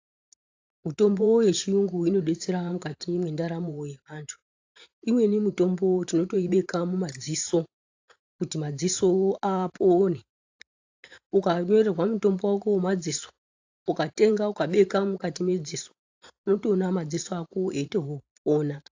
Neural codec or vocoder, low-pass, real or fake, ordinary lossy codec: vocoder, 44.1 kHz, 128 mel bands every 512 samples, BigVGAN v2; 7.2 kHz; fake; AAC, 48 kbps